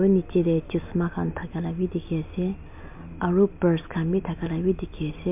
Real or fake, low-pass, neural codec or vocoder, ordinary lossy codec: real; 3.6 kHz; none; MP3, 32 kbps